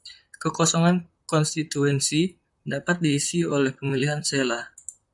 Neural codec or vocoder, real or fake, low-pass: vocoder, 44.1 kHz, 128 mel bands, Pupu-Vocoder; fake; 10.8 kHz